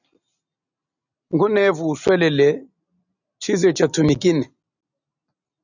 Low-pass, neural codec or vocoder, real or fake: 7.2 kHz; none; real